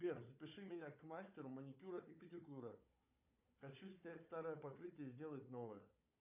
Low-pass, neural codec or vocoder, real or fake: 3.6 kHz; codec, 16 kHz, 4 kbps, FunCodec, trained on Chinese and English, 50 frames a second; fake